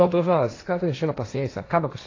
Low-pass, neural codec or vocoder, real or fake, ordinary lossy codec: 7.2 kHz; codec, 16 kHz, 1.1 kbps, Voila-Tokenizer; fake; AAC, 32 kbps